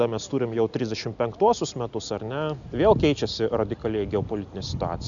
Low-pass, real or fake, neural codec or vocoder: 7.2 kHz; real; none